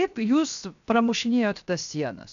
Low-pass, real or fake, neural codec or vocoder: 7.2 kHz; fake; codec, 16 kHz, 0.7 kbps, FocalCodec